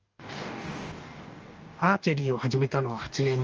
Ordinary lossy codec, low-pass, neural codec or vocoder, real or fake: Opus, 24 kbps; 7.2 kHz; codec, 44.1 kHz, 2.6 kbps, DAC; fake